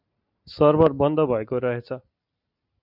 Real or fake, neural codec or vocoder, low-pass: real; none; 5.4 kHz